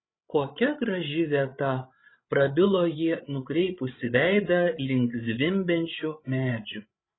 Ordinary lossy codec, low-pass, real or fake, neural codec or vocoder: AAC, 16 kbps; 7.2 kHz; fake; codec, 16 kHz, 16 kbps, FreqCodec, larger model